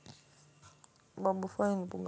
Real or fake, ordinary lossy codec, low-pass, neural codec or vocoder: real; none; none; none